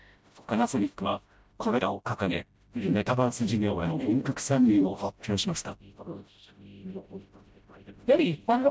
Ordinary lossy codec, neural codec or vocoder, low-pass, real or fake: none; codec, 16 kHz, 0.5 kbps, FreqCodec, smaller model; none; fake